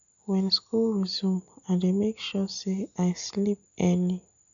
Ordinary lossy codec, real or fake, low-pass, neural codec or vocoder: AAC, 64 kbps; real; 7.2 kHz; none